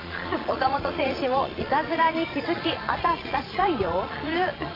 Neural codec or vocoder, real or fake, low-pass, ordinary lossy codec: vocoder, 22.05 kHz, 80 mel bands, Vocos; fake; 5.4 kHz; AAC, 24 kbps